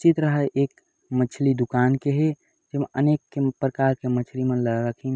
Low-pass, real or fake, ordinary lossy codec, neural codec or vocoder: none; real; none; none